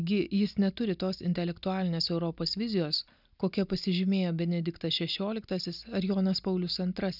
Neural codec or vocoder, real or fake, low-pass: none; real; 5.4 kHz